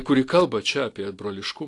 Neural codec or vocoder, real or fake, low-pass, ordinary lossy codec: none; real; 10.8 kHz; AAC, 48 kbps